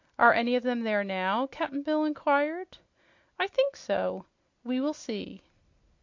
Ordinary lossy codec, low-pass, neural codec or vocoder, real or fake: MP3, 48 kbps; 7.2 kHz; none; real